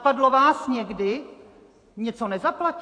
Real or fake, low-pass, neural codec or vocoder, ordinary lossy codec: fake; 9.9 kHz; vocoder, 44.1 kHz, 128 mel bands every 512 samples, BigVGAN v2; AAC, 48 kbps